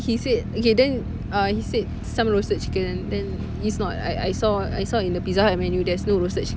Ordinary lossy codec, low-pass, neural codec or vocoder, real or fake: none; none; none; real